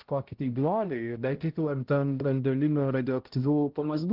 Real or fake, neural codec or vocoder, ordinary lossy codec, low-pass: fake; codec, 16 kHz, 0.5 kbps, X-Codec, HuBERT features, trained on balanced general audio; Opus, 16 kbps; 5.4 kHz